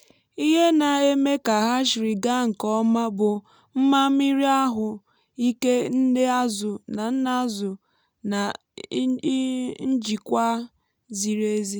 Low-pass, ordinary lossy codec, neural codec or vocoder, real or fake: none; none; none; real